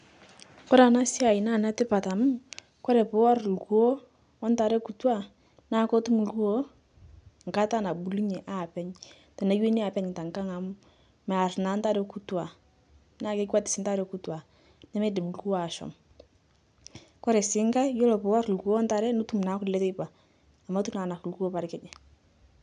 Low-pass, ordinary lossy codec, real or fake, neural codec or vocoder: 9.9 kHz; none; real; none